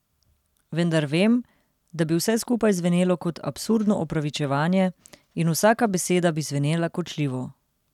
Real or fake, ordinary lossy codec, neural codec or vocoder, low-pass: real; none; none; 19.8 kHz